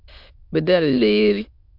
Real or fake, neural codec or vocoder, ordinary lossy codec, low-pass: fake; autoencoder, 22.05 kHz, a latent of 192 numbers a frame, VITS, trained on many speakers; MP3, 48 kbps; 5.4 kHz